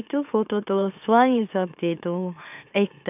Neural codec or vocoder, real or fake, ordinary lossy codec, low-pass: autoencoder, 44.1 kHz, a latent of 192 numbers a frame, MeloTTS; fake; none; 3.6 kHz